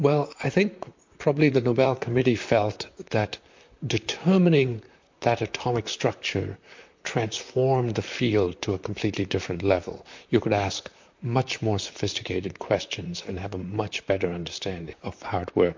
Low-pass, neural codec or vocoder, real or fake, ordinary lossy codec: 7.2 kHz; vocoder, 44.1 kHz, 128 mel bands, Pupu-Vocoder; fake; MP3, 48 kbps